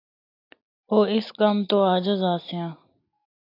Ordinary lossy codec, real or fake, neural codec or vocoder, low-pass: AAC, 32 kbps; real; none; 5.4 kHz